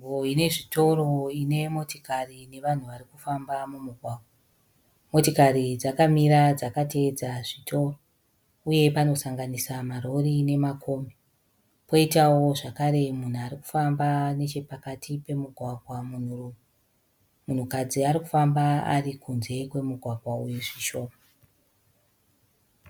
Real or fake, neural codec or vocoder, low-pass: real; none; 19.8 kHz